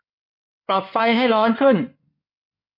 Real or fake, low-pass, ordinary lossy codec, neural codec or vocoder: fake; 5.4 kHz; MP3, 48 kbps; codec, 16 kHz in and 24 kHz out, 2.2 kbps, FireRedTTS-2 codec